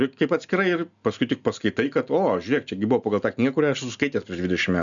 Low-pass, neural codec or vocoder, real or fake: 7.2 kHz; none; real